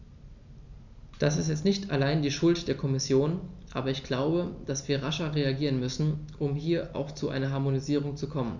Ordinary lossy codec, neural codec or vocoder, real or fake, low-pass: none; none; real; 7.2 kHz